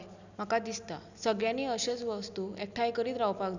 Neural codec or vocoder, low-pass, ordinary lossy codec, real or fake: none; 7.2 kHz; none; real